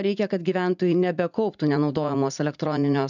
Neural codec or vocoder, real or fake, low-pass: vocoder, 44.1 kHz, 80 mel bands, Vocos; fake; 7.2 kHz